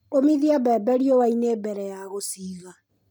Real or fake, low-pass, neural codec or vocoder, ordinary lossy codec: real; none; none; none